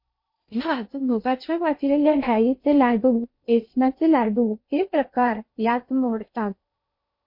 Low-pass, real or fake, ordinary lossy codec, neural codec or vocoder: 5.4 kHz; fake; MP3, 32 kbps; codec, 16 kHz in and 24 kHz out, 0.6 kbps, FocalCodec, streaming, 2048 codes